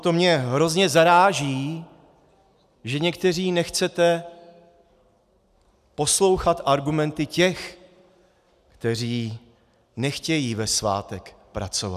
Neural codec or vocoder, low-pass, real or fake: none; 14.4 kHz; real